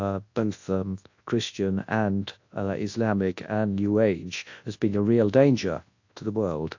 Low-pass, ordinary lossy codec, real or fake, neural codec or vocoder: 7.2 kHz; AAC, 48 kbps; fake; codec, 24 kHz, 0.9 kbps, WavTokenizer, large speech release